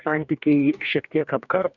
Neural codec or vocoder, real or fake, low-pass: codec, 44.1 kHz, 2.6 kbps, DAC; fake; 7.2 kHz